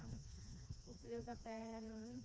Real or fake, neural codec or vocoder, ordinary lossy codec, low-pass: fake; codec, 16 kHz, 2 kbps, FreqCodec, smaller model; none; none